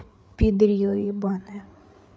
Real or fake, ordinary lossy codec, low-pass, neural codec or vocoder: fake; none; none; codec, 16 kHz, 8 kbps, FreqCodec, larger model